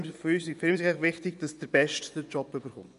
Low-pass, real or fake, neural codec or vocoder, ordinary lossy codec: 10.8 kHz; fake; vocoder, 24 kHz, 100 mel bands, Vocos; none